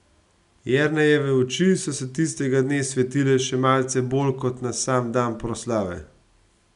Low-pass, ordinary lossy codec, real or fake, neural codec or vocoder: 10.8 kHz; none; real; none